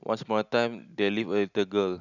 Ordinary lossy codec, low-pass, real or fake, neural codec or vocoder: none; 7.2 kHz; real; none